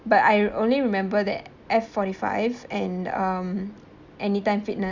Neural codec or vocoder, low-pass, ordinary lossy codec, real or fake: none; 7.2 kHz; Opus, 64 kbps; real